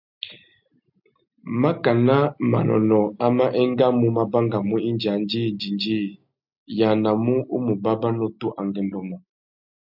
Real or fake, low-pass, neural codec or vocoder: real; 5.4 kHz; none